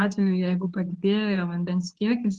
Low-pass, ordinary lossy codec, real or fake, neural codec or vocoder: 10.8 kHz; Opus, 16 kbps; fake; codec, 24 kHz, 0.9 kbps, WavTokenizer, medium speech release version 2